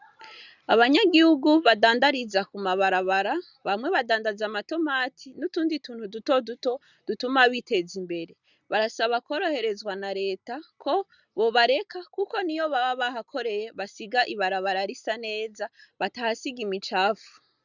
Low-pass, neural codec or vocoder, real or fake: 7.2 kHz; none; real